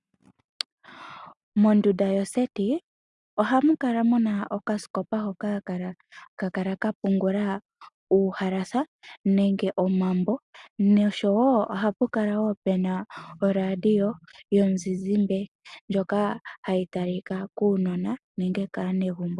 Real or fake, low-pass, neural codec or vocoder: real; 10.8 kHz; none